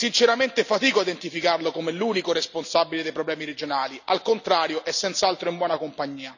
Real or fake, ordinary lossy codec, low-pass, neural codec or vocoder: real; none; 7.2 kHz; none